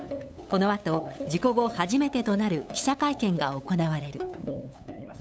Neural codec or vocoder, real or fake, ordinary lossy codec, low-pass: codec, 16 kHz, 8 kbps, FunCodec, trained on LibriTTS, 25 frames a second; fake; none; none